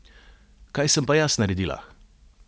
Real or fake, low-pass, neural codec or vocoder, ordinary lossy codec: real; none; none; none